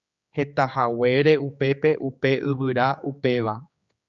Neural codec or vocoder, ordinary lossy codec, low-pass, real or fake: codec, 16 kHz, 4 kbps, X-Codec, HuBERT features, trained on general audio; Opus, 64 kbps; 7.2 kHz; fake